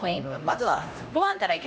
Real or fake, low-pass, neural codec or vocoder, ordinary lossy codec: fake; none; codec, 16 kHz, 1 kbps, X-Codec, HuBERT features, trained on LibriSpeech; none